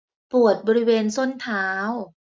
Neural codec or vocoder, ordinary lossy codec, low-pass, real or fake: none; none; none; real